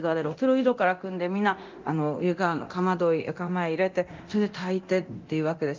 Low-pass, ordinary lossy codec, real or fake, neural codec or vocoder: 7.2 kHz; Opus, 24 kbps; fake; codec, 24 kHz, 0.9 kbps, DualCodec